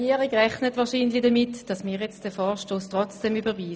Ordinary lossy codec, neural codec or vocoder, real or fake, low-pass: none; none; real; none